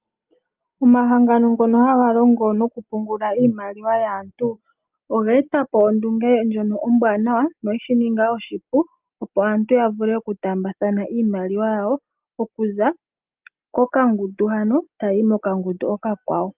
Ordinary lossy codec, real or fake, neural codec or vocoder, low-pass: Opus, 32 kbps; real; none; 3.6 kHz